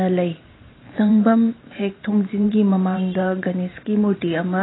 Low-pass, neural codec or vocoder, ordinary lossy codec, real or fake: 7.2 kHz; vocoder, 44.1 kHz, 128 mel bands every 512 samples, BigVGAN v2; AAC, 16 kbps; fake